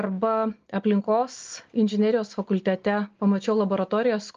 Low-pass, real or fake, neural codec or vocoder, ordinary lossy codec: 7.2 kHz; real; none; Opus, 32 kbps